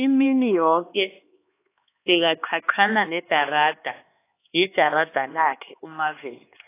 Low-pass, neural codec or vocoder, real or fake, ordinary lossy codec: 3.6 kHz; codec, 16 kHz, 2 kbps, X-Codec, HuBERT features, trained on LibriSpeech; fake; AAC, 24 kbps